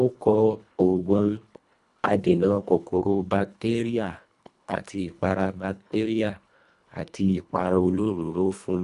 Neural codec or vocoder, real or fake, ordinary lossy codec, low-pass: codec, 24 kHz, 1.5 kbps, HILCodec; fake; none; 10.8 kHz